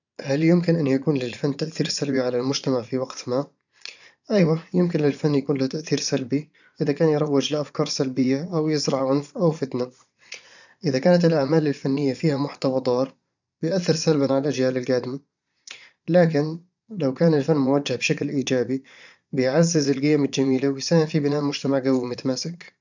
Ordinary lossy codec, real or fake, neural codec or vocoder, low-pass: none; fake; vocoder, 22.05 kHz, 80 mel bands, Vocos; 7.2 kHz